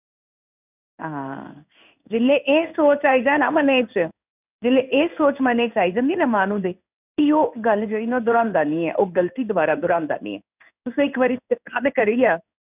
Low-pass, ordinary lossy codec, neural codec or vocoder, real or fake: 3.6 kHz; none; codec, 16 kHz in and 24 kHz out, 1 kbps, XY-Tokenizer; fake